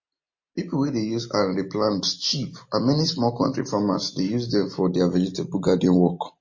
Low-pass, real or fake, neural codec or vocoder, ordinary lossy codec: 7.2 kHz; real; none; MP3, 32 kbps